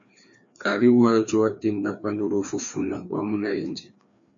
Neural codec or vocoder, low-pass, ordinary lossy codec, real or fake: codec, 16 kHz, 2 kbps, FreqCodec, larger model; 7.2 kHz; MP3, 64 kbps; fake